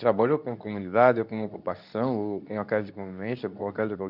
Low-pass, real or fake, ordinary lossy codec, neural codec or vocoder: 5.4 kHz; fake; none; codec, 24 kHz, 0.9 kbps, WavTokenizer, medium speech release version 2